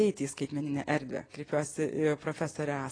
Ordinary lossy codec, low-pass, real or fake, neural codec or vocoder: AAC, 32 kbps; 9.9 kHz; real; none